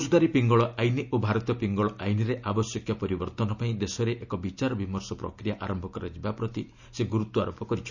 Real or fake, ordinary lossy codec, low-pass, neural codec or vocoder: real; none; 7.2 kHz; none